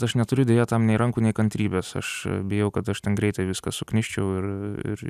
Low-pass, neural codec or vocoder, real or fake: 14.4 kHz; none; real